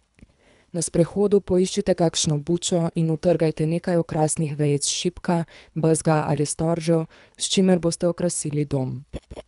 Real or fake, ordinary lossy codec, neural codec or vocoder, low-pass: fake; none; codec, 24 kHz, 3 kbps, HILCodec; 10.8 kHz